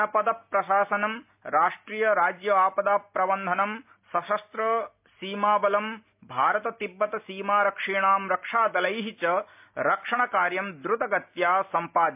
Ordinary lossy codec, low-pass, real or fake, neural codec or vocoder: MP3, 32 kbps; 3.6 kHz; real; none